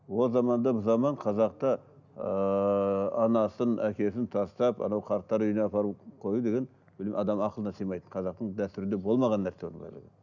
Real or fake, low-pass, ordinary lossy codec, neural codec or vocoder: real; 7.2 kHz; none; none